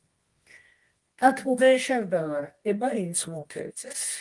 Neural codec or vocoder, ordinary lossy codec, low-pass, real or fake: codec, 24 kHz, 0.9 kbps, WavTokenizer, medium music audio release; Opus, 24 kbps; 10.8 kHz; fake